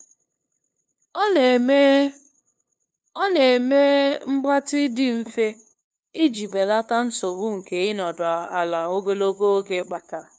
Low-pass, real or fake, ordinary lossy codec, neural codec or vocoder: none; fake; none; codec, 16 kHz, 2 kbps, FunCodec, trained on LibriTTS, 25 frames a second